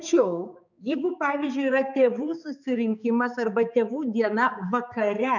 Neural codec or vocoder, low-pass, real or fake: codec, 16 kHz, 4 kbps, X-Codec, HuBERT features, trained on balanced general audio; 7.2 kHz; fake